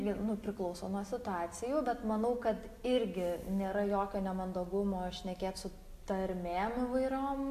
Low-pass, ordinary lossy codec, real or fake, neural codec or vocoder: 14.4 kHz; MP3, 64 kbps; real; none